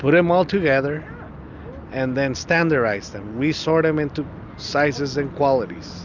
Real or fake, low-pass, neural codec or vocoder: real; 7.2 kHz; none